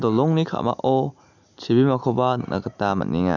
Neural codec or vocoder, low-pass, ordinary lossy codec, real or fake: none; 7.2 kHz; none; real